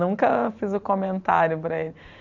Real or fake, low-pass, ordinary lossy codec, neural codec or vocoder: fake; 7.2 kHz; none; vocoder, 44.1 kHz, 128 mel bands every 512 samples, BigVGAN v2